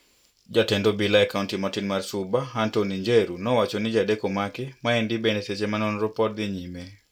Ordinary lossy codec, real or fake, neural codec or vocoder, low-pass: none; real; none; 19.8 kHz